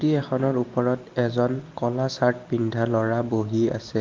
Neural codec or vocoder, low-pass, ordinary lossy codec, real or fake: none; 7.2 kHz; Opus, 32 kbps; real